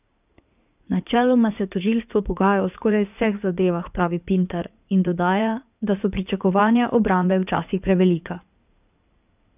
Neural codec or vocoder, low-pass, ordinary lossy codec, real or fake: codec, 16 kHz in and 24 kHz out, 2.2 kbps, FireRedTTS-2 codec; 3.6 kHz; none; fake